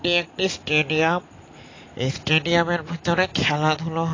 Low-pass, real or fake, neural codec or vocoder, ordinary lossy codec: 7.2 kHz; real; none; AAC, 32 kbps